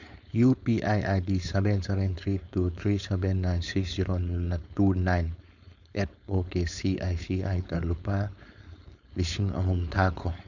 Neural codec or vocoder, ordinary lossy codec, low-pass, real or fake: codec, 16 kHz, 4.8 kbps, FACodec; none; 7.2 kHz; fake